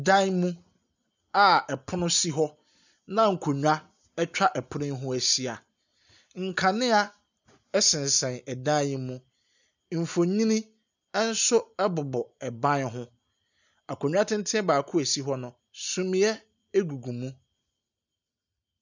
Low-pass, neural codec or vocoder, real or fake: 7.2 kHz; none; real